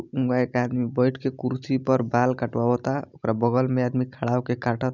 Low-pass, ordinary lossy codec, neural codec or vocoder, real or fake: 7.2 kHz; none; none; real